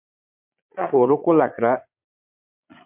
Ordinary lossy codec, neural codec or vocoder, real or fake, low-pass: MP3, 32 kbps; vocoder, 22.05 kHz, 80 mel bands, Vocos; fake; 3.6 kHz